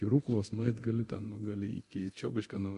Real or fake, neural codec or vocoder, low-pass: fake; codec, 24 kHz, 0.9 kbps, DualCodec; 10.8 kHz